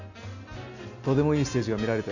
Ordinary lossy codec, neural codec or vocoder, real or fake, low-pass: none; none; real; 7.2 kHz